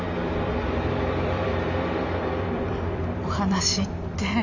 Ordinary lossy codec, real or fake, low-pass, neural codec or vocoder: none; fake; 7.2 kHz; vocoder, 44.1 kHz, 128 mel bands every 256 samples, BigVGAN v2